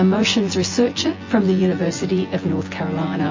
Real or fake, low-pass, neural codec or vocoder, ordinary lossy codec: fake; 7.2 kHz; vocoder, 24 kHz, 100 mel bands, Vocos; MP3, 32 kbps